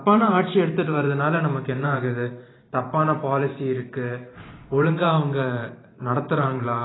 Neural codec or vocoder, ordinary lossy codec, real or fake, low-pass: vocoder, 44.1 kHz, 128 mel bands every 512 samples, BigVGAN v2; AAC, 16 kbps; fake; 7.2 kHz